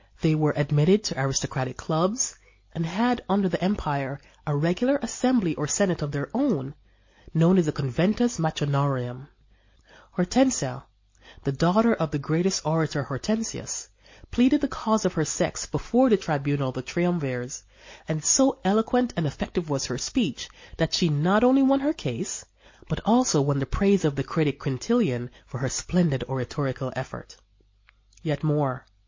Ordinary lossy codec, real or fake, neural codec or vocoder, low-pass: MP3, 32 kbps; real; none; 7.2 kHz